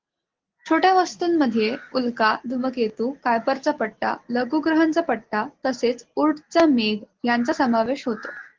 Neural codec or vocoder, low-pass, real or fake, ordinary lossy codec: none; 7.2 kHz; real; Opus, 16 kbps